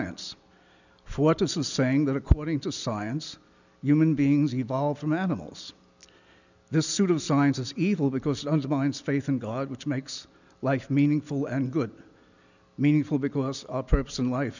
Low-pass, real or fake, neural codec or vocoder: 7.2 kHz; real; none